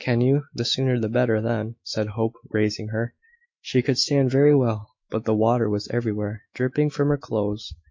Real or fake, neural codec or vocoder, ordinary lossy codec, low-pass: fake; autoencoder, 48 kHz, 128 numbers a frame, DAC-VAE, trained on Japanese speech; MP3, 48 kbps; 7.2 kHz